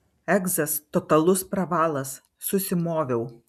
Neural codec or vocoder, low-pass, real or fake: none; 14.4 kHz; real